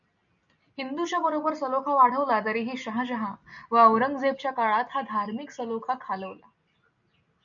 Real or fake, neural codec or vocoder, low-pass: real; none; 7.2 kHz